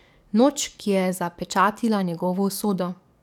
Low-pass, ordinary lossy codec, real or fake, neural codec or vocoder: 19.8 kHz; none; fake; codec, 44.1 kHz, 7.8 kbps, DAC